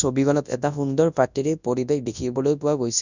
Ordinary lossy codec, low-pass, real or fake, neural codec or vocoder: none; 7.2 kHz; fake; codec, 24 kHz, 0.9 kbps, WavTokenizer, large speech release